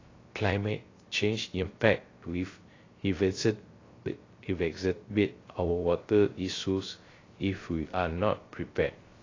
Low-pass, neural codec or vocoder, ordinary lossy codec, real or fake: 7.2 kHz; codec, 16 kHz, 0.3 kbps, FocalCodec; AAC, 32 kbps; fake